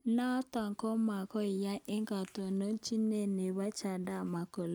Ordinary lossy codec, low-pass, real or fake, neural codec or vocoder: none; none; real; none